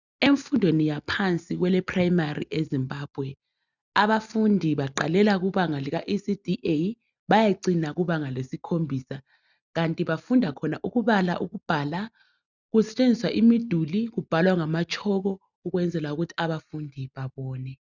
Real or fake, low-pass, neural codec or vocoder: real; 7.2 kHz; none